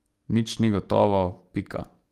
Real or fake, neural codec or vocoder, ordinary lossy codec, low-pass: fake; codec, 44.1 kHz, 7.8 kbps, DAC; Opus, 24 kbps; 14.4 kHz